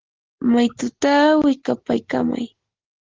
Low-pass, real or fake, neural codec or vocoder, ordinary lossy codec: 7.2 kHz; real; none; Opus, 16 kbps